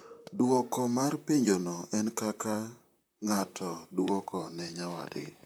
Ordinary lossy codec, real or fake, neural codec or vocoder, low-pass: none; fake; vocoder, 44.1 kHz, 128 mel bands, Pupu-Vocoder; none